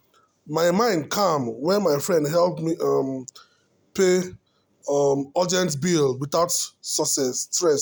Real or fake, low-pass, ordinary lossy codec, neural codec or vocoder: real; none; none; none